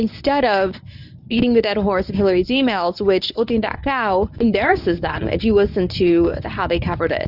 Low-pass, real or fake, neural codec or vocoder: 5.4 kHz; fake; codec, 24 kHz, 0.9 kbps, WavTokenizer, medium speech release version 1